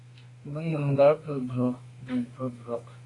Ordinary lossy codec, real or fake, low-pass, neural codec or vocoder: AAC, 32 kbps; fake; 10.8 kHz; autoencoder, 48 kHz, 32 numbers a frame, DAC-VAE, trained on Japanese speech